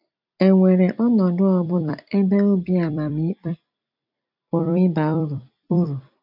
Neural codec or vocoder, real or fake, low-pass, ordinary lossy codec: vocoder, 44.1 kHz, 128 mel bands every 256 samples, BigVGAN v2; fake; 5.4 kHz; none